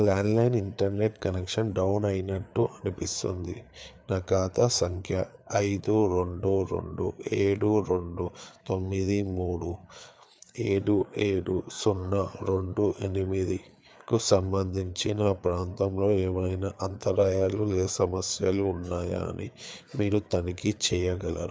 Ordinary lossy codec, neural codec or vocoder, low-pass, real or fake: none; codec, 16 kHz, 4 kbps, FreqCodec, larger model; none; fake